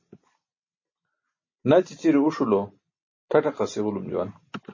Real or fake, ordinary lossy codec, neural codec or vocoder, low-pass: real; MP3, 32 kbps; none; 7.2 kHz